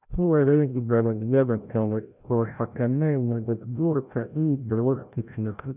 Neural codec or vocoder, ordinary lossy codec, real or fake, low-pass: codec, 16 kHz, 0.5 kbps, FreqCodec, larger model; none; fake; 3.6 kHz